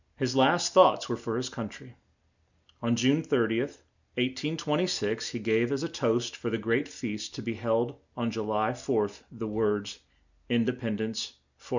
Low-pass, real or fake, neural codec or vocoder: 7.2 kHz; real; none